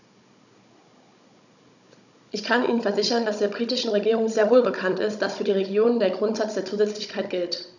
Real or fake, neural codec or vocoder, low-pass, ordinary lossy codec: fake; codec, 16 kHz, 16 kbps, FunCodec, trained on Chinese and English, 50 frames a second; 7.2 kHz; none